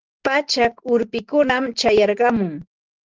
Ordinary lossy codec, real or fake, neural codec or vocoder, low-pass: Opus, 16 kbps; real; none; 7.2 kHz